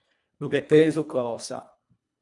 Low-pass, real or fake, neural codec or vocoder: 10.8 kHz; fake; codec, 24 kHz, 1.5 kbps, HILCodec